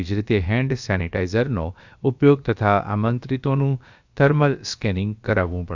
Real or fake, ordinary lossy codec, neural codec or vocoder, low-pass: fake; Opus, 64 kbps; codec, 16 kHz, about 1 kbps, DyCAST, with the encoder's durations; 7.2 kHz